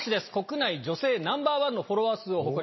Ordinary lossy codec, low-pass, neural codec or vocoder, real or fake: MP3, 24 kbps; 7.2 kHz; none; real